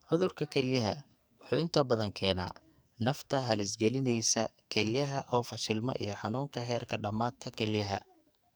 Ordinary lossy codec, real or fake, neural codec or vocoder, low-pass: none; fake; codec, 44.1 kHz, 2.6 kbps, SNAC; none